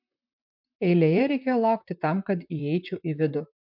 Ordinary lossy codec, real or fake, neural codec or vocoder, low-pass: MP3, 48 kbps; fake; vocoder, 44.1 kHz, 128 mel bands, Pupu-Vocoder; 5.4 kHz